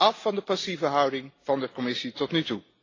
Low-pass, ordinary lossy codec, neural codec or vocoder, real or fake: 7.2 kHz; AAC, 32 kbps; none; real